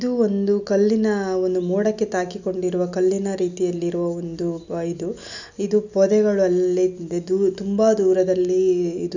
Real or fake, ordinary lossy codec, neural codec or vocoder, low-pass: real; none; none; 7.2 kHz